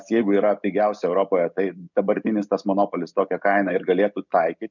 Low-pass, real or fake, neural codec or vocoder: 7.2 kHz; real; none